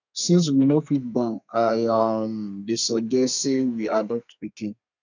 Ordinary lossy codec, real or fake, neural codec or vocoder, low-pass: none; fake; codec, 32 kHz, 1.9 kbps, SNAC; 7.2 kHz